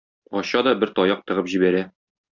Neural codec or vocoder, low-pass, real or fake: none; 7.2 kHz; real